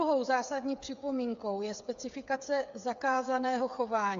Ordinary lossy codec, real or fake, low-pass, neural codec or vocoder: AAC, 64 kbps; fake; 7.2 kHz; codec, 16 kHz, 8 kbps, FreqCodec, smaller model